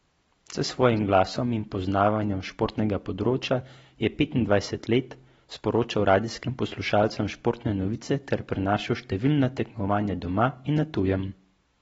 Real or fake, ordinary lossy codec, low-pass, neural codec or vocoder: real; AAC, 24 kbps; 19.8 kHz; none